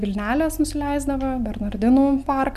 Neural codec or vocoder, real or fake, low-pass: none; real; 14.4 kHz